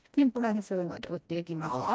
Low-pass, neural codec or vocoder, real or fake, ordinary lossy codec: none; codec, 16 kHz, 1 kbps, FreqCodec, smaller model; fake; none